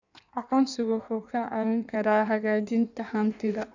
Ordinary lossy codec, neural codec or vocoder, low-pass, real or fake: none; codec, 16 kHz in and 24 kHz out, 1.1 kbps, FireRedTTS-2 codec; 7.2 kHz; fake